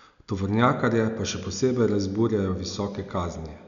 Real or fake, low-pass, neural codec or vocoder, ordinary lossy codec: real; 7.2 kHz; none; none